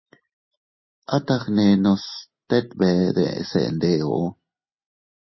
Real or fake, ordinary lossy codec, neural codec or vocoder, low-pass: real; MP3, 24 kbps; none; 7.2 kHz